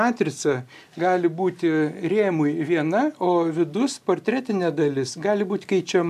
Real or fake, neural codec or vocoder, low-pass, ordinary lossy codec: real; none; 14.4 kHz; AAC, 96 kbps